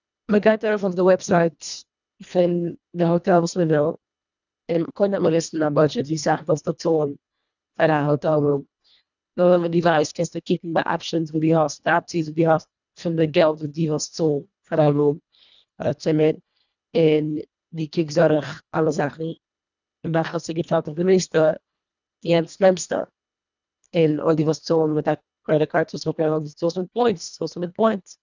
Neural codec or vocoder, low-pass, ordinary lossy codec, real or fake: codec, 24 kHz, 1.5 kbps, HILCodec; 7.2 kHz; none; fake